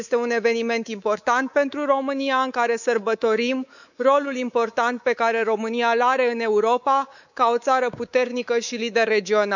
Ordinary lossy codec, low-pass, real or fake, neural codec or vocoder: none; 7.2 kHz; fake; codec, 24 kHz, 3.1 kbps, DualCodec